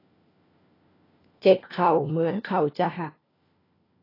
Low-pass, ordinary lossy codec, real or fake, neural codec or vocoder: 5.4 kHz; AAC, 24 kbps; fake; codec, 16 kHz, 1 kbps, FunCodec, trained on LibriTTS, 50 frames a second